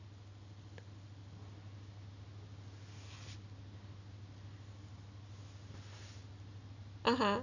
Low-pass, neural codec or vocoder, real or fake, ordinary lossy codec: 7.2 kHz; none; real; none